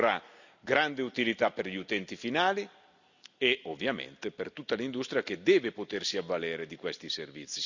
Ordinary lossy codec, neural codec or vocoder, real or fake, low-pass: none; none; real; 7.2 kHz